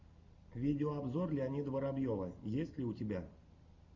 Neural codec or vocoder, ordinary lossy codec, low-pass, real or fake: none; Opus, 64 kbps; 7.2 kHz; real